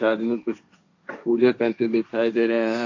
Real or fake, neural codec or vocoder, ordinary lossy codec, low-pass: fake; codec, 16 kHz, 1.1 kbps, Voila-Tokenizer; AAC, 48 kbps; 7.2 kHz